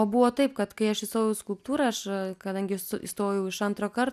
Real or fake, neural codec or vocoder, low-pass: real; none; 14.4 kHz